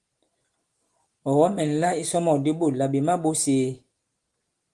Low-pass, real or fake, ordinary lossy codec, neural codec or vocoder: 10.8 kHz; real; Opus, 24 kbps; none